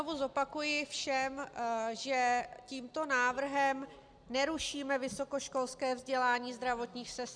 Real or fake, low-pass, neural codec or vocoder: real; 9.9 kHz; none